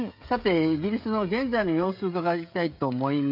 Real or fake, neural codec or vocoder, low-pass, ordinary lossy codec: fake; codec, 16 kHz, 16 kbps, FreqCodec, smaller model; 5.4 kHz; AAC, 48 kbps